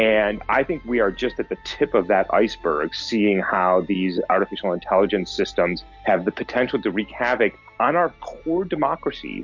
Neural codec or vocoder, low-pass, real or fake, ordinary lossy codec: none; 7.2 kHz; real; MP3, 48 kbps